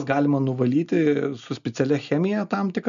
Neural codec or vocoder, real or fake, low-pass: none; real; 7.2 kHz